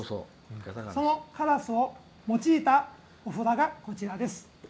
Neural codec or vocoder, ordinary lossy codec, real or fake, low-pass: none; none; real; none